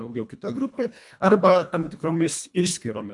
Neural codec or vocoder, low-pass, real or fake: codec, 24 kHz, 1.5 kbps, HILCodec; 10.8 kHz; fake